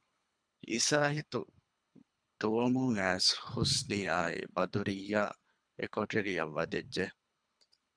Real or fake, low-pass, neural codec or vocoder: fake; 9.9 kHz; codec, 24 kHz, 3 kbps, HILCodec